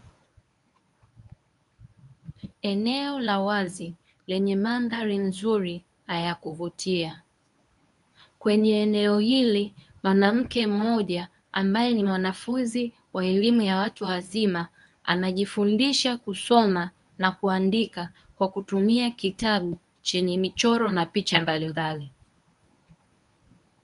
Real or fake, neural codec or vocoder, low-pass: fake; codec, 24 kHz, 0.9 kbps, WavTokenizer, medium speech release version 1; 10.8 kHz